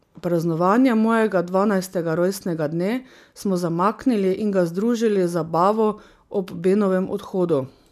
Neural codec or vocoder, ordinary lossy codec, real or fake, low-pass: none; none; real; 14.4 kHz